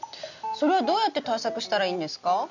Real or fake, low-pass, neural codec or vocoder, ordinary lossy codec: real; 7.2 kHz; none; none